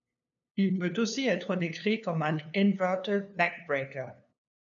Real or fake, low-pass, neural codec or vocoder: fake; 7.2 kHz; codec, 16 kHz, 2 kbps, FunCodec, trained on LibriTTS, 25 frames a second